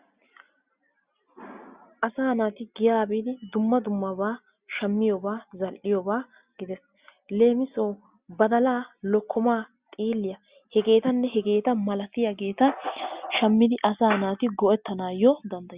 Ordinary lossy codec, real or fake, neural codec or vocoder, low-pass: Opus, 64 kbps; real; none; 3.6 kHz